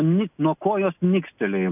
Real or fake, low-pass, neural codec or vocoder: real; 3.6 kHz; none